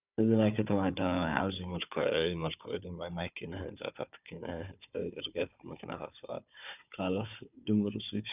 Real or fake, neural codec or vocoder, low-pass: fake; codec, 16 kHz, 4 kbps, FunCodec, trained on Chinese and English, 50 frames a second; 3.6 kHz